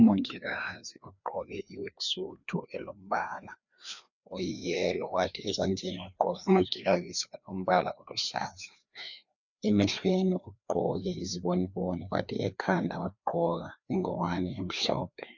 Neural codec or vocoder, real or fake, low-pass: codec, 16 kHz, 2 kbps, FreqCodec, larger model; fake; 7.2 kHz